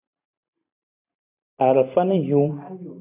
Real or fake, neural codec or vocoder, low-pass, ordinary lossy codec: fake; vocoder, 44.1 kHz, 128 mel bands every 512 samples, BigVGAN v2; 3.6 kHz; AAC, 24 kbps